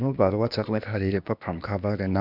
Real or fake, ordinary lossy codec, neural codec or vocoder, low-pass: fake; none; codec, 16 kHz, 0.8 kbps, ZipCodec; 5.4 kHz